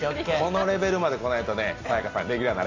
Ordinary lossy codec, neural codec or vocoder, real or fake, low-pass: AAC, 32 kbps; none; real; 7.2 kHz